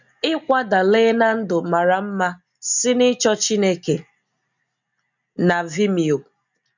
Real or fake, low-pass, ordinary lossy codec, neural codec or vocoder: real; 7.2 kHz; none; none